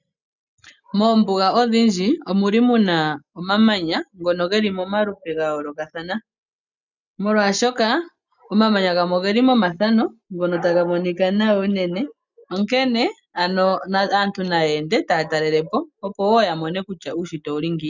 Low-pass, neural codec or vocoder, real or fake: 7.2 kHz; none; real